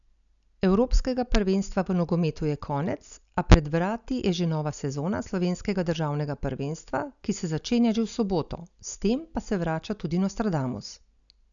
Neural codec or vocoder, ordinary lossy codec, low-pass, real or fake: none; none; 7.2 kHz; real